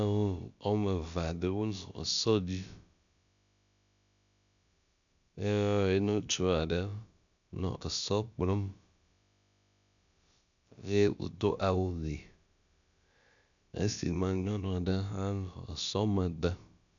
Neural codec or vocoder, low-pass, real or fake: codec, 16 kHz, about 1 kbps, DyCAST, with the encoder's durations; 7.2 kHz; fake